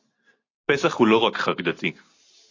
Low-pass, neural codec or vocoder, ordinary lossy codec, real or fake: 7.2 kHz; none; AAC, 32 kbps; real